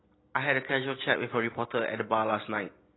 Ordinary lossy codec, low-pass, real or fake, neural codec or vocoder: AAC, 16 kbps; 7.2 kHz; real; none